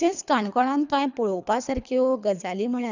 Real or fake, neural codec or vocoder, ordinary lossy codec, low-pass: fake; codec, 24 kHz, 3 kbps, HILCodec; none; 7.2 kHz